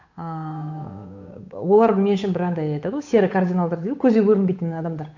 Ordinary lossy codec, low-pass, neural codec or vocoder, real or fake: AAC, 48 kbps; 7.2 kHz; codec, 16 kHz, 8 kbps, FunCodec, trained on Chinese and English, 25 frames a second; fake